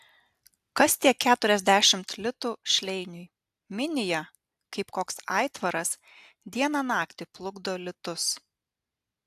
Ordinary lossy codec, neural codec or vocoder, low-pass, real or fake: AAC, 96 kbps; none; 14.4 kHz; real